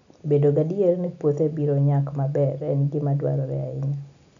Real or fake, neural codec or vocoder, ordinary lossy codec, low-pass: real; none; none; 7.2 kHz